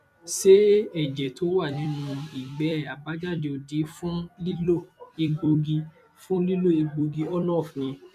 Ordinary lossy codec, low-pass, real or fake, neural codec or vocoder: none; 14.4 kHz; fake; vocoder, 44.1 kHz, 128 mel bands every 256 samples, BigVGAN v2